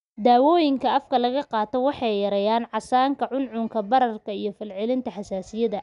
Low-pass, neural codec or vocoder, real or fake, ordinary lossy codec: 10.8 kHz; none; real; none